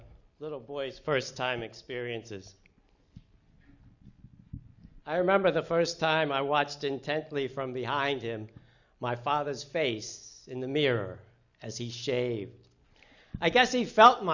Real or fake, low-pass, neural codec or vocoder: real; 7.2 kHz; none